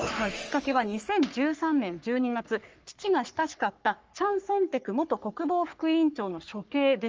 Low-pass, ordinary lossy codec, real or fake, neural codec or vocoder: 7.2 kHz; Opus, 24 kbps; fake; codec, 44.1 kHz, 3.4 kbps, Pupu-Codec